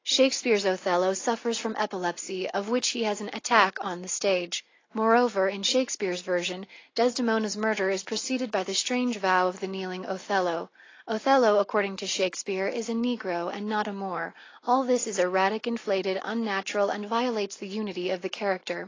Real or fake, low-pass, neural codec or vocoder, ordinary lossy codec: real; 7.2 kHz; none; AAC, 32 kbps